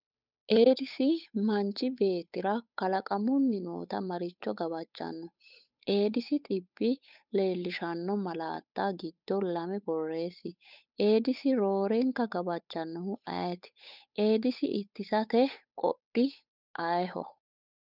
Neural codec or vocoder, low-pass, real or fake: codec, 16 kHz, 8 kbps, FunCodec, trained on Chinese and English, 25 frames a second; 5.4 kHz; fake